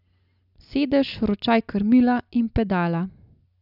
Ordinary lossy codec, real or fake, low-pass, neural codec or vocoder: none; real; 5.4 kHz; none